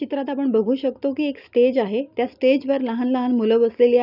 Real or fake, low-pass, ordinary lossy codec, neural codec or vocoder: real; 5.4 kHz; none; none